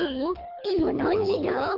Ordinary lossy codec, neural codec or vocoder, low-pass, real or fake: AAC, 48 kbps; codec, 24 kHz, 3 kbps, HILCodec; 5.4 kHz; fake